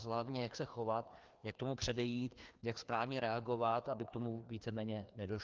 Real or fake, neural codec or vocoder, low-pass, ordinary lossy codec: fake; codec, 16 kHz, 2 kbps, FreqCodec, larger model; 7.2 kHz; Opus, 24 kbps